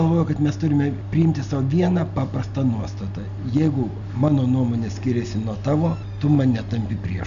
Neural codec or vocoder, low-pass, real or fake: none; 7.2 kHz; real